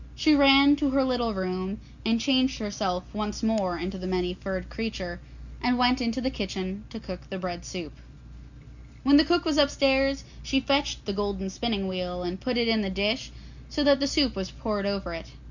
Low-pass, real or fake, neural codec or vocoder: 7.2 kHz; real; none